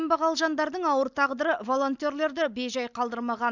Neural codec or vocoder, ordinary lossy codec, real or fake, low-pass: none; none; real; 7.2 kHz